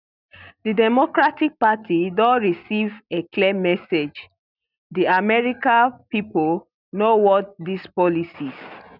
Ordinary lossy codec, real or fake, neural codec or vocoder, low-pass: none; real; none; 5.4 kHz